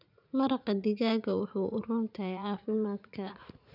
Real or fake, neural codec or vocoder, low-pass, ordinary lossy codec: fake; vocoder, 44.1 kHz, 80 mel bands, Vocos; 5.4 kHz; none